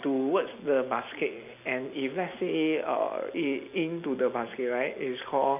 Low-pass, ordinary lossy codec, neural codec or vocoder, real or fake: 3.6 kHz; none; none; real